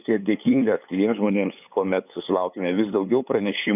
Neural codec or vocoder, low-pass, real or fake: codec, 16 kHz, 8 kbps, FunCodec, trained on LibriTTS, 25 frames a second; 3.6 kHz; fake